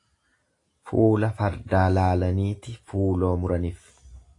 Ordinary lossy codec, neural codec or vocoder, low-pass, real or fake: AAC, 48 kbps; none; 10.8 kHz; real